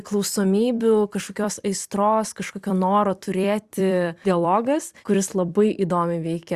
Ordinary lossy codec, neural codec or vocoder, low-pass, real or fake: Opus, 64 kbps; vocoder, 44.1 kHz, 128 mel bands every 256 samples, BigVGAN v2; 14.4 kHz; fake